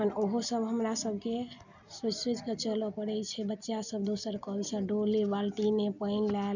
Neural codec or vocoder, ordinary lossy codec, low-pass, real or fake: none; none; 7.2 kHz; real